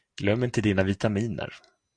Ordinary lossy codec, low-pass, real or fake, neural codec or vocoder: Opus, 64 kbps; 9.9 kHz; real; none